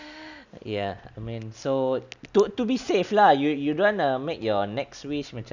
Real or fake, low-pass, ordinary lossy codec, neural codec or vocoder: real; 7.2 kHz; none; none